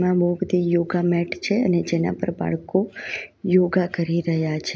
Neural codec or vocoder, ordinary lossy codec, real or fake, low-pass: none; none; real; none